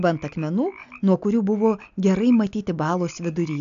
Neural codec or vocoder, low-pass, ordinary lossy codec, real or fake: none; 7.2 kHz; AAC, 96 kbps; real